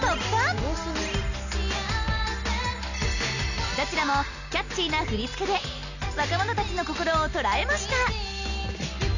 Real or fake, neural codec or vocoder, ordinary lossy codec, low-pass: real; none; none; 7.2 kHz